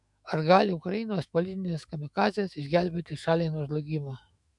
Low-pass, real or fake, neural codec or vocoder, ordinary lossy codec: 10.8 kHz; fake; autoencoder, 48 kHz, 128 numbers a frame, DAC-VAE, trained on Japanese speech; MP3, 96 kbps